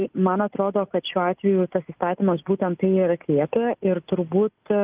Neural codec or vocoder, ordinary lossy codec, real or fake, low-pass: none; Opus, 24 kbps; real; 3.6 kHz